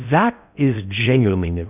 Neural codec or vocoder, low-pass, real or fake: codec, 16 kHz in and 24 kHz out, 0.6 kbps, FocalCodec, streaming, 4096 codes; 3.6 kHz; fake